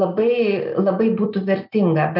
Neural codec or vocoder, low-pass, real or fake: none; 5.4 kHz; real